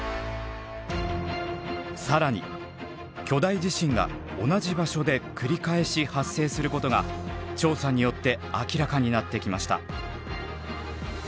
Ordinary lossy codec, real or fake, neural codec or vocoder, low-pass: none; real; none; none